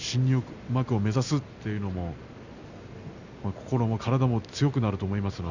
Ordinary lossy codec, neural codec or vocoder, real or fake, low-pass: none; none; real; 7.2 kHz